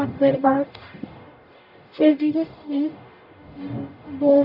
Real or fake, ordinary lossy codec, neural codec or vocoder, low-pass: fake; none; codec, 44.1 kHz, 0.9 kbps, DAC; 5.4 kHz